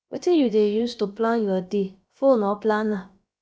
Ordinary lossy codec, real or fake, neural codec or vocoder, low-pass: none; fake; codec, 16 kHz, about 1 kbps, DyCAST, with the encoder's durations; none